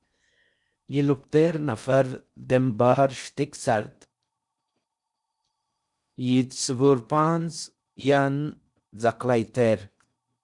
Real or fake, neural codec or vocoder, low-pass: fake; codec, 16 kHz in and 24 kHz out, 0.6 kbps, FocalCodec, streaming, 4096 codes; 10.8 kHz